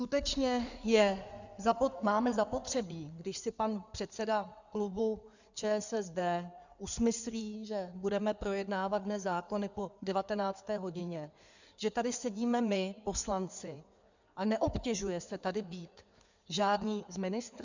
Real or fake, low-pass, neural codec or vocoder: fake; 7.2 kHz; codec, 16 kHz in and 24 kHz out, 2.2 kbps, FireRedTTS-2 codec